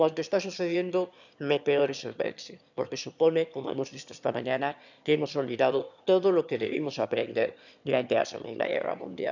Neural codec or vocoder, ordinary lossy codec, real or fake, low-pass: autoencoder, 22.05 kHz, a latent of 192 numbers a frame, VITS, trained on one speaker; none; fake; 7.2 kHz